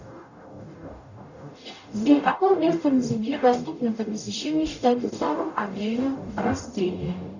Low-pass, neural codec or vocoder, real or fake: 7.2 kHz; codec, 44.1 kHz, 0.9 kbps, DAC; fake